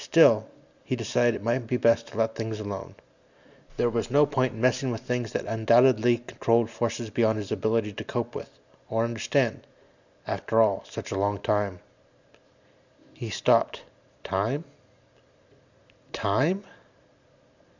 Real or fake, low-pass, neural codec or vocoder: real; 7.2 kHz; none